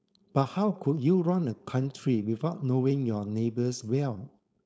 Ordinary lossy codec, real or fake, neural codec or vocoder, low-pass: none; fake; codec, 16 kHz, 4.8 kbps, FACodec; none